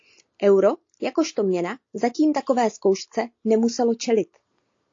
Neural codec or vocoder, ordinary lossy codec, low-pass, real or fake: none; AAC, 48 kbps; 7.2 kHz; real